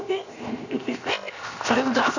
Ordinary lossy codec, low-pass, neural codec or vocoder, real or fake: none; 7.2 kHz; codec, 16 kHz, 0.7 kbps, FocalCodec; fake